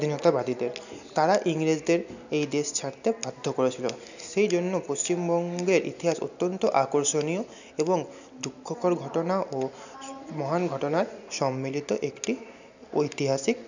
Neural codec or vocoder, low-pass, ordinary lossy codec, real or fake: none; 7.2 kHz; none; real